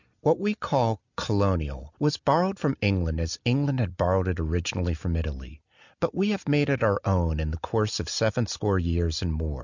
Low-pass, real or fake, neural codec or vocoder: 7.2 kHz; real; none